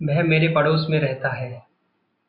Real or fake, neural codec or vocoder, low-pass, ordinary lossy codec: real; none; 5.4 kHz; Opus, 64 kbps